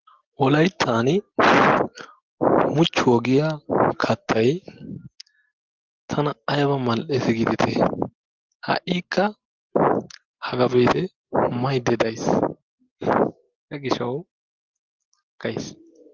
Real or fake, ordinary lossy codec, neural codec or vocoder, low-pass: real; Opus, 16 kbps; none; 7.2 kHz